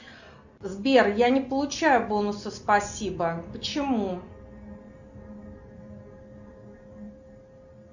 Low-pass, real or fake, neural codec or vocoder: 7.2 kHz; real; none